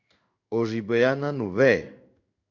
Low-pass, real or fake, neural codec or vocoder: 7.2 kHz; fake; codec, 16 kHz in and 24 kHz out, 1 kbps, XY-Tokenizer